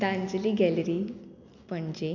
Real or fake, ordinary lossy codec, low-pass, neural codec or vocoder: real; none; 7.2 kHz; none